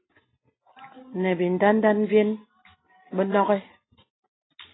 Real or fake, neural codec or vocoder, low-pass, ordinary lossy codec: real; none; 7.2 kHz; AAC, 16 kbps